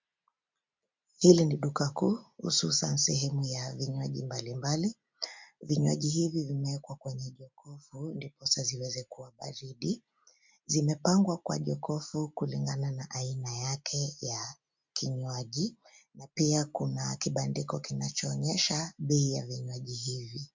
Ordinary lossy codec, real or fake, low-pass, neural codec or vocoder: MP3, 64 kbps; real; 7.2 kHz; none